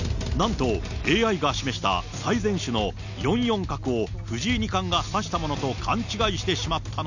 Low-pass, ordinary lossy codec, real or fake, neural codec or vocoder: 7.2 kHz; none; real; none